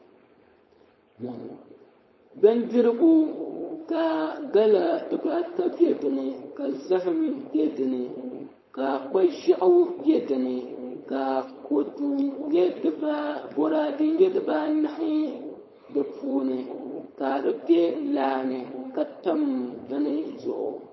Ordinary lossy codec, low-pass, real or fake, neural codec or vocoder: MP3, 24 kbps; 7.2 kHz; fake; codec, 16 kHz, 4.8 kbps, FACodec